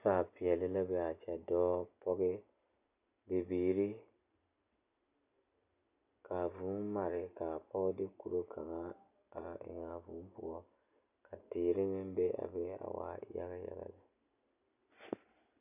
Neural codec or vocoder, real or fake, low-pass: none; real; 3.6 kHz